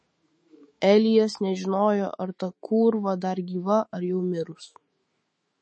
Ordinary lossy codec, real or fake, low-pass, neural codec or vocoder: MP3, 32 kbps; fake; 9.9 kHz; autoencoder, 48 kHz, 128 numbers a frame, DAC-VAE, trained on Japanese speech